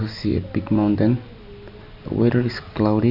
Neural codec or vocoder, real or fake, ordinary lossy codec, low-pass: none; real; none; 5.4 kHz